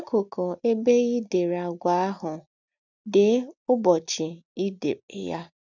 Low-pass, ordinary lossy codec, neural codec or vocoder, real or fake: 7.2 kHz; none; none; real